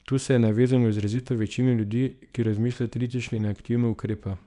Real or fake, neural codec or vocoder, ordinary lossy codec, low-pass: fake; codec, 24 kHz, 0.9 kbps, WavTokenizer, small release; none; 10.8 kHz